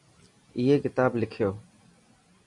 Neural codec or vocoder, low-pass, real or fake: none; 10.8 kHz; real